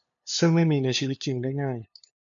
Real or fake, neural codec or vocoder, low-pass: fake; codec, 16 kHz, 2 kbps, FunCodec, trained on LibriTTS, 25 frames a second; 7.2 kHz